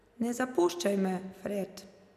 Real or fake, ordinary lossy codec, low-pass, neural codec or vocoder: real; none; 14.4 kHz; none